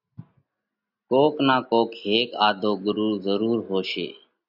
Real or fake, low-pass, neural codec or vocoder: real; 5.4 kHz; none